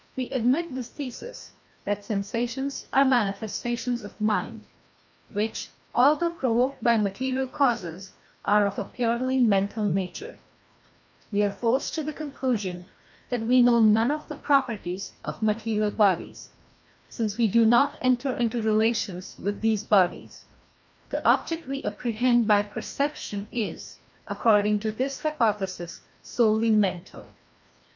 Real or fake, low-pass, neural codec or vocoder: fake; 7.2 kHz; codec, 16 kHz, 1 kbps, FreqCodec, larger model